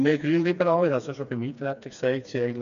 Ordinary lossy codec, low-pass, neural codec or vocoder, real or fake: none; 7.2 kHz; codec, 16 kHz, 2 kbps, FreqCodec, smaller model; fake